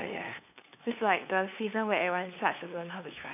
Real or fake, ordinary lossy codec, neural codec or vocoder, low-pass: fake; none; codec, 16 kHz, 2 kbps, FunCodec, trained on LibriTTS, 25 frames a second; 3.6 kHz